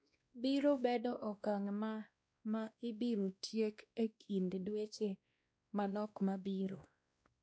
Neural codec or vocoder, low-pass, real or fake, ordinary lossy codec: codec, 16 kHz, 1 kbps, X-Codec, WavLM features, trained on Multilingual LibriSpeech; none; fake; none